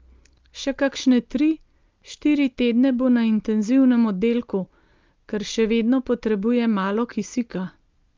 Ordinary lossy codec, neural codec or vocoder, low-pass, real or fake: Opus, 32 kbps; none; 7.2 kHz; real